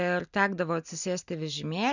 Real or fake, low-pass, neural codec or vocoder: real; 7.2 kHz; none